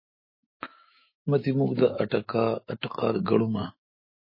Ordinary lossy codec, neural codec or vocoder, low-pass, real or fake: MP3, 24 kbps; autoencoder, 48 kHz, 128 numbers a frame, DAC-VAE, trained on Japanese speech; 5.4 kHz; fake